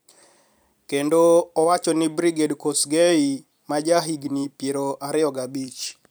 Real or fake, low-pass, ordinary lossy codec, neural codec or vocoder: fake; none; none; vocoder, 44.1 kHz, 128 mel bands every 256 samples, BigVGAN v2